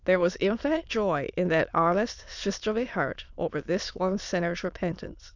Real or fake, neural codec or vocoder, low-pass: fake; autoencoder, 22.05 kHz, a latent of 192 numbers a frame, VITS, trained on many speakers; 7.2 kHz